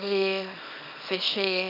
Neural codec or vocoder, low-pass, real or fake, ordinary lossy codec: codec, 24 kHz, 0.9 kbps, WavTokenizer, small release; 5.4 kHz; fake; none